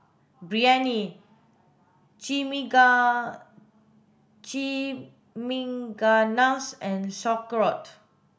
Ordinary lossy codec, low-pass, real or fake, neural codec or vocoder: none; none; real; none